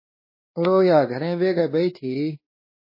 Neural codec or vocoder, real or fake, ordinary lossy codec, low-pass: codec, 16 kHz, 2 kbps, X-Codec, WavLM features, trained on Multilingual LibriSpeech; fake; MP3, 24 kbps; 5.4 kHz